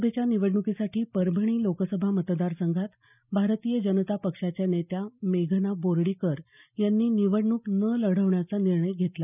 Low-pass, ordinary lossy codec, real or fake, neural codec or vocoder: 3.6 kHz; none; real; none